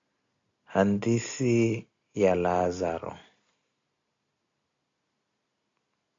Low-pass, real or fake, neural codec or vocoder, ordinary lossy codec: 7.2 kHz; real; none; AAC, 48 kbps